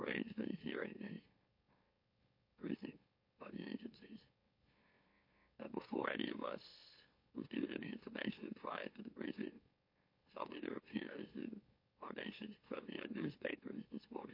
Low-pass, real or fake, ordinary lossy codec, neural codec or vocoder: 5.4 kHz; fake; MP3, 32 kbps; autoencoder, 44.1 kHz, a latent of 192 numbers a frame, MeloTTS